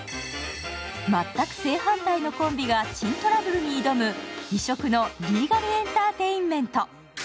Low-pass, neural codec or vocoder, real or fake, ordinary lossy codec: none; none; real; none